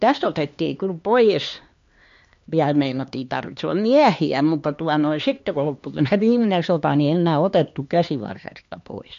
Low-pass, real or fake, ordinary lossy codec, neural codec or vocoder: 7.2 kHz; fake; MP3, 48 kbps; codec, 16 kHz, 2 kbps, X-Codec, HuBERT features, trained on LibriSpeech